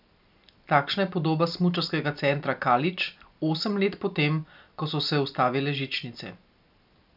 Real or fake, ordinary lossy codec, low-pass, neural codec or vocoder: real; none; 5.4 kHz; none